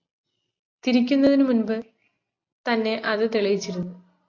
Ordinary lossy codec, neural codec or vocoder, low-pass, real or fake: AAC, 48 kbps; none; 7.2 kHz; real